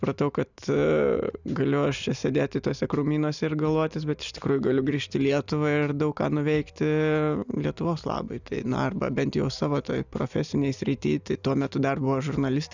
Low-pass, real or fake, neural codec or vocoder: 7.2 kHz; real; none